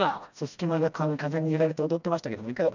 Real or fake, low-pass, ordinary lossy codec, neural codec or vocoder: fake; 7.2 kHz; none; codec, 16 kHz, 1 kbps, FreqCodec, smaller model